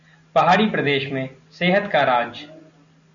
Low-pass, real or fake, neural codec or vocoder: 7.2 kHz; real; none